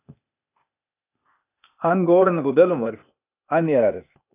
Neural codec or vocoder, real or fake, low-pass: codec, 16 kHz, 0.8 kbps, ZipCodec; fake; 3.6 kHz